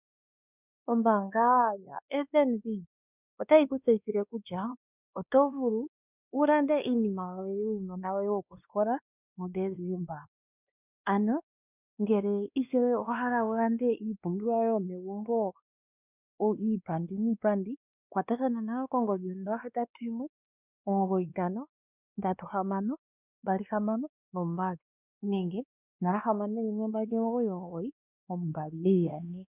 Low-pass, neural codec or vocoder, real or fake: 3.6 kHz; codec, 16 kHz, 2 kbps, X-Codec, WavLM features, trained on Multilingual LibriSpeech; fake